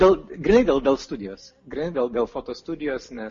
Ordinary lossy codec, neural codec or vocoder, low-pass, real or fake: MP3, 32 kbps; none; 7.2 kHz; real